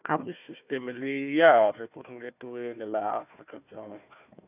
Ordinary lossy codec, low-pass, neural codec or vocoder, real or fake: none; 3.6 kHz; codec, 16 kHz, 1 kbps, FunCodec, trained on Chinese and English, 50 frames a second; fake